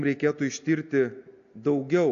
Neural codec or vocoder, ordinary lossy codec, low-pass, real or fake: none; AAC, 48 kbps; 7.2 kHz; real